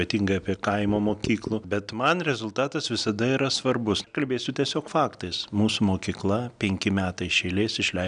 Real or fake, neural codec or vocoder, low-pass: real; none; 9.9 kHz